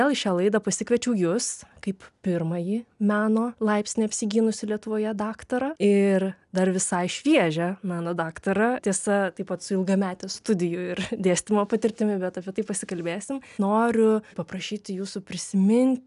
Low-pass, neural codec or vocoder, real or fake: 10.8 kHz; none; real